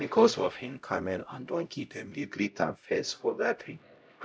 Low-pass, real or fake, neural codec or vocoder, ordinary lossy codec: none; fake; codec, 16 kHz, 0.5 kbps, X-Codec, HuBERT features, trained on LibriSpeech; none